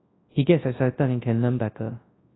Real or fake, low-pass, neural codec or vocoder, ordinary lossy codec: fake; 7.2 kHz; codec, 24 kHz, 0.9 kbps, WavTokenizer, large speech release; AAC, 16 kbps